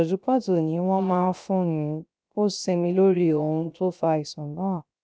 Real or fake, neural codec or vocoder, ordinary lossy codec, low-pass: fake; codec, 16 kHz, 0.3 kbps, FocalCodec; none; none